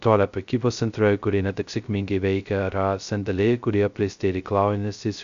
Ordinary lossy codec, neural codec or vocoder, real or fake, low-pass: Opus, 64 kbps; codec, 16 kHz, 0.2 kbps, FocalCodec; fake; 7.2 kHz